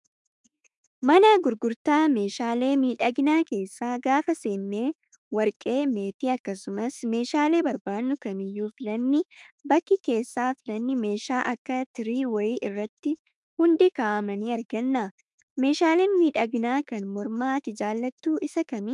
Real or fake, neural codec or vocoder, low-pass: fake; autoencoder, 48 kHz, 32 numbers a frame, DAC-VAE, trained on Japanese speech; 10.8 kHz